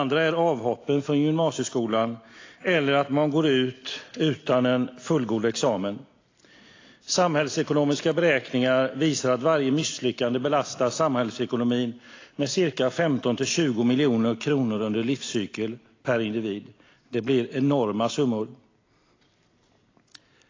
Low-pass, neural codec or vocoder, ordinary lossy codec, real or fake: 7.2 kHz; none; AAC, 32 kbps; real